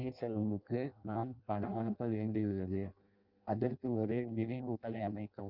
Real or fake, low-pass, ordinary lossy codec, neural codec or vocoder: fake; 5.4 kHz; Opus, 32 kbps; codec, 16 kHz in and 24 kHz out, 0.6 kbps, FireRedTTS-2 codec